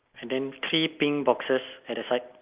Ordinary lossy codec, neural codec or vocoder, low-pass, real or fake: Opus, 24 kbps; none; 3.6 kHz; real